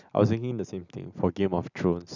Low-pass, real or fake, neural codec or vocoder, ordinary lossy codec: 7.2 kHz; real; none; none